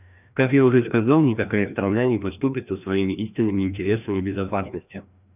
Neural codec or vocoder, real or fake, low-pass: codec, 16 kHz, 1 kbps, FreqCodec, larger model; fake; 3.6 kHz